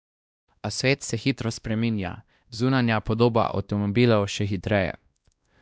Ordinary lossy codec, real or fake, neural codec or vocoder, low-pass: none; fake; codec, 16 kHz, 1 kbps, X-Codec, WavLM features, trained on Multilingual LibriSpeech; none